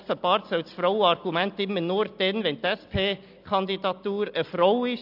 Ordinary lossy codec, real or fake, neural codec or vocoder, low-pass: AAC, 48 kbps; real; none; 5.4 kHz